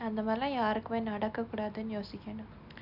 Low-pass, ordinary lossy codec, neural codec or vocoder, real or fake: 5.4 kHz; none; none; real